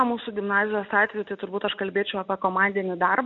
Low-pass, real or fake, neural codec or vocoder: 7.2 kHz; real; none